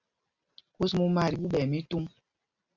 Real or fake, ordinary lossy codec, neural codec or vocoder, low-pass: real; Opus, 64 kbps; none; 7.2 kHz